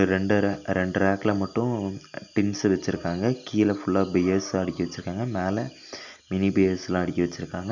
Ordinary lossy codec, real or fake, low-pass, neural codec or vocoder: none; real; 7.2 kHz; none